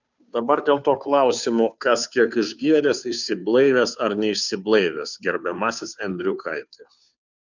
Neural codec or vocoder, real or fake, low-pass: codec, 16 kHz, 2 kbps, FunCodec, trained on Chinese and English, 25 frames a second; fake; 7.2 kHz